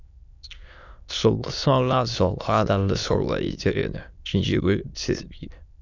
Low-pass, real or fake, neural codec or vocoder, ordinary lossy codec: 7.2 kHz; fake; autoencoder, 22.05 kHz, a latent of 192 numbers a frame, VITS, trained on many speakers; none